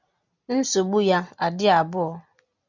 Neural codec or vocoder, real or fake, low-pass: none; real; 7.2 kHz